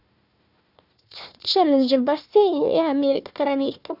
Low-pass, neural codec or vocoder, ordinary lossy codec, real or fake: 5.4 kHz; codec, 16 kHz, 1 kbps, FunCodec, trained on Chinese and English, 50 frames a second; none; fake